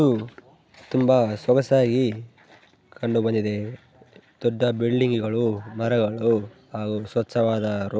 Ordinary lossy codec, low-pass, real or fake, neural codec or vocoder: none; none; real; none